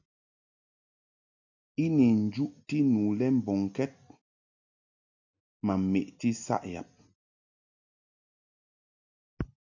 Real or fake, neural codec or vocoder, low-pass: real; none; 7.2 kHz